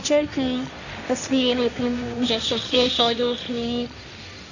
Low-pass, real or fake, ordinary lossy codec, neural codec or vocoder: 7.2 kHz; fake; none; codec, 16 kHz, 1.1 kbps, Voila-Tokenizer